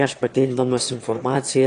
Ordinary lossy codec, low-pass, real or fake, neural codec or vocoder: AAC, 48 kbps; 9.9 kHz; fake; autoencoder, 22.05 kHz, a latent of 192 numbers a frame, VITS, trained on one speaker